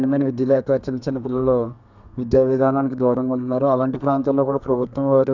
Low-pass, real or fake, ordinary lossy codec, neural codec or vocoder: 7.2 kHz; fake; none; codec, 32 kHz, 1.9 kbps, SNAC